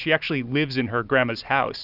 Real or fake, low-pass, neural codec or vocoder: real; 5.4 kHz; none